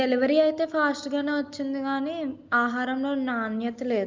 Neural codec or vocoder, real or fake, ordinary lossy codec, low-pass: none; real; Opus, 24 kbps; 7.2 kHz